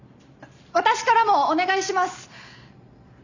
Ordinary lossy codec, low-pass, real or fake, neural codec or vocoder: none; 7.2 kHz; real; none